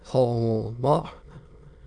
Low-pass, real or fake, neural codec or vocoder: 9.9 kHz; fake; autoencoder, 22.05 kHz, a latent of 192 numbers a frame, VITS, trained on many speakers